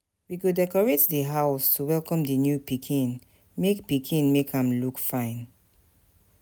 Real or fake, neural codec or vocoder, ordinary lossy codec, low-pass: real; none; none; none